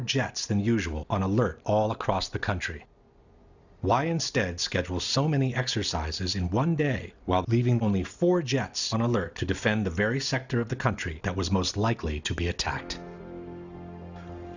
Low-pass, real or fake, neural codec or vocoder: 7.2 kHz; real; none